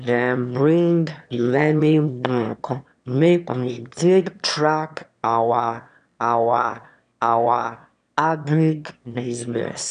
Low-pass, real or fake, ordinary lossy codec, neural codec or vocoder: 9.9 kHz; fake; none; autoencoder, 22.05 kHz, a latent of 192 numbers a frame, VITS, trained on one speaker